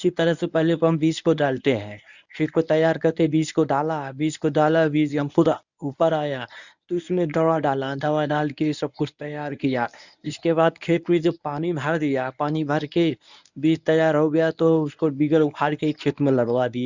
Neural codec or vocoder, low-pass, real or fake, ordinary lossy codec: codec, 24 kHz, 0.9 kbps, WavTokenizer, medium speech release version 1; 7.2 kHz; fake; none